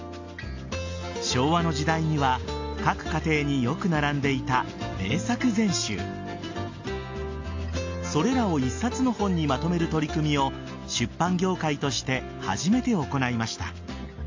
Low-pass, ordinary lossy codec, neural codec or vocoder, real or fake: 7.2 kHz; AAC, 48 kbps; none; real